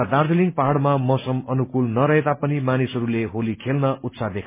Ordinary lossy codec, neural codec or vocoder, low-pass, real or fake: MP3, 24 kbps; none; 3.6 kHz; real